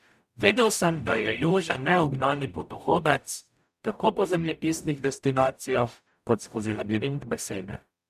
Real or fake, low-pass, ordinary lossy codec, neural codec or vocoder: fake; 14.4 kHz; none; codec, 44.1 kHz, 0.9 kbps, DAC